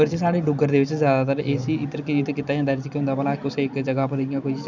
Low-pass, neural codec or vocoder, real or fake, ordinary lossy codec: 7.2 kHz; none; real; none